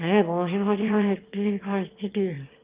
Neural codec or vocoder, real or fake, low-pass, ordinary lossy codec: autoencoder, 22.05 kHz, a latent of 192 numbers a frame, VITS, trained on one speaker; fake; 3.6 kHz; Opus, 32 kbps